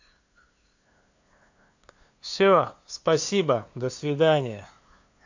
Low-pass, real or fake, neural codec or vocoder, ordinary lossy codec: 7.2 kHz; fake; codec, 16 kHz, 2 kbps, FunCodec, trained on LibriTTS, 25 frames a second; AAC, 48 kbps